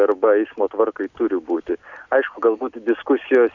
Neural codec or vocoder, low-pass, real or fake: none; 7.2 kHz; real